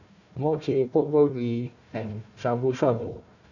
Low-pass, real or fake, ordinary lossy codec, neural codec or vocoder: 7.2 kHz; fake; none; codec, 16 kHz, 1 kbps, FunCodec, trained on Chinese and English, 50 frames a second